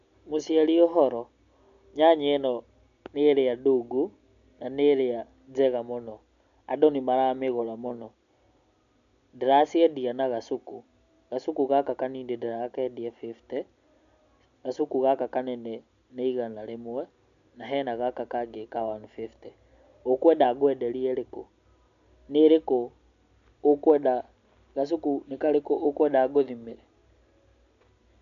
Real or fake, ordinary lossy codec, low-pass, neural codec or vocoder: real; none; 7.2 kHz; none